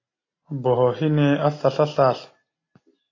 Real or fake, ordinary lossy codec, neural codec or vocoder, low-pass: real; AAC, 32 kbps; none; 7.2 kHz